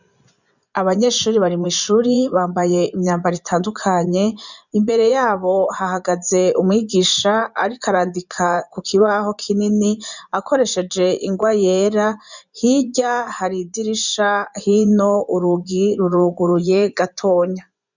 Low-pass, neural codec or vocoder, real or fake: 7.2 kHz; vocoder, 24 kHz, 100 mel bands, Vocos; fake